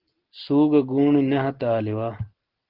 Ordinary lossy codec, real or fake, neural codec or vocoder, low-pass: Opus, 16 kbps; real; none; 5.4 kHz